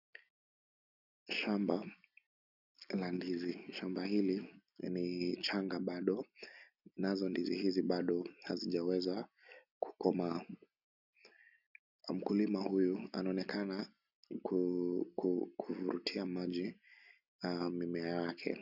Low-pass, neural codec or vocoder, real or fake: 5.4 kHz; none; real